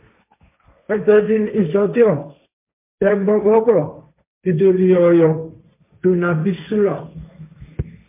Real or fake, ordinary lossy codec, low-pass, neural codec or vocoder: fake; MP3, 32 kbps; 3.6 kHz; codec, 16 kHz, 1.1 kbps, Voila-Tokenizer